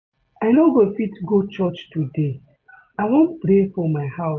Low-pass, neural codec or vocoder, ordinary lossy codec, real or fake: 7.2 kHz; none; none; real